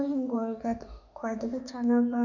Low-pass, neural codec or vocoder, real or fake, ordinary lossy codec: 7.2 kHz; autoencoder, 48 kHz, 32 numbers a frame, DAC-VAE, trained on Japanese speech; fake; none